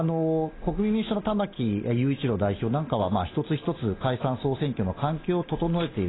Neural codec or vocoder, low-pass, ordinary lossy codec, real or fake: none; 7.2 kHz; AAC, 16 kbps; real